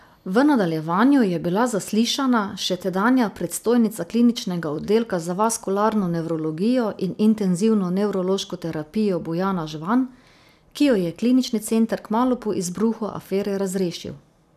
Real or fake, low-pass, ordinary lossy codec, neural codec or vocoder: real; 14.4 kHz; none; none